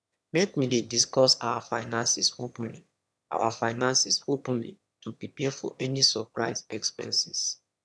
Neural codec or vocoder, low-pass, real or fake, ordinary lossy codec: autoencoder, 22.05 kHz, a latent of 192 numbers a frame, VITS, trained on one speaker; none; fake; none